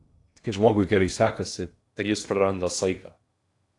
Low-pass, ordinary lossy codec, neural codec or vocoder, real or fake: 10.8 kHz; AAC, 48 kbps; codec, 16 kHz in and 24 kHz out, 0.8 kbps, FocalCodec, streaming, 65536 codes; fake